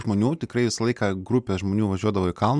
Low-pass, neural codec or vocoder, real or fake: 9.9 kHz; none; real